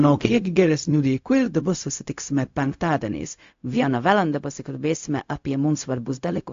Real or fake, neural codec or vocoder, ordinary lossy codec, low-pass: fake; codec, 16 kHz, 0.4 kbps, LongCat-Audio-Codec; AAC, 48 kbps; 7.2 kHz